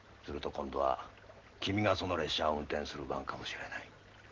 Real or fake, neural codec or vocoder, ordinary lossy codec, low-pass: real; none; Opus, 16 kbps; 7.2 kHz